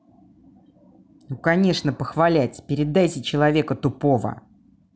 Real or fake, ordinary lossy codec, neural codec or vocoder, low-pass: real; none; none; none